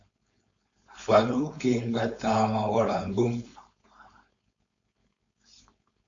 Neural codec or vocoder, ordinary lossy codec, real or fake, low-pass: codec, 16 kHz, 4.8 kbps, FACodec; AAC, 48 kbps; fake; 7.2 kHz